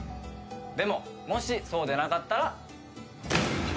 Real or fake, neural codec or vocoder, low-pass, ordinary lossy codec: real; none; none; none